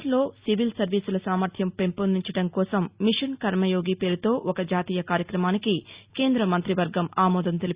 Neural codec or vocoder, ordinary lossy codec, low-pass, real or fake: none; Opus, 64 kbps; 3.6 kHz; real